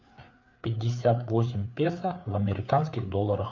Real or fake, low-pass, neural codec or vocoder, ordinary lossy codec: fake; 7.2 kHz; codec, 16 kHz, 4 kbps, FreqCodec, larger model; MP3, 64 kbps